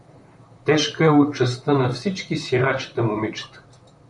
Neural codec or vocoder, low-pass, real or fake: vocoder, 44.1 kHz, 128 mel bands, Pupu-Vocoder; 10.8 kHz; fake